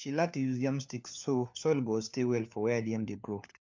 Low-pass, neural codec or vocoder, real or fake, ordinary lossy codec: 7.2 kHz; codec, 16 kHz, 4 kbps, FunCodec, trained on LibriTTS, 50 frames a second; fake; MP3, 64 kbps